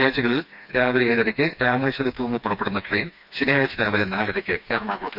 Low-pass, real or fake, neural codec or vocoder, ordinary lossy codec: 5.4 kHz; fake; codec, 16 kHz, 2 kbps, FreqCodec, smaller model; none